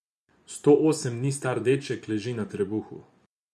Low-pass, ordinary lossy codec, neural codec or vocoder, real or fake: none; none; vocoder, 24 kHz, 100 mel bands, Vocos; fake